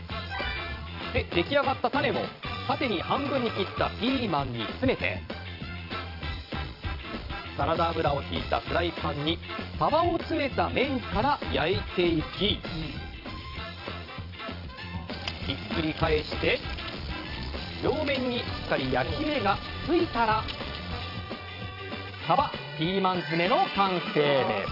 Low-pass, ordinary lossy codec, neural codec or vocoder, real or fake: 5.4 kHz; AAC, 48 kbps; vocoder, 22.05 kHz, 80 mel bands, Vocos; fake